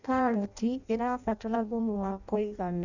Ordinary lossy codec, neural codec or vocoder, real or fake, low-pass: none; codec, 16 kHz in and 24 kHz out, 0.6 kbps, FireRedTTS-2 codec; fake; 7.2 kHz